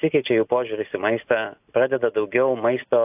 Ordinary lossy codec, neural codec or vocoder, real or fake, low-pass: AAC, 32 kbps; none; real; 3.6 kHz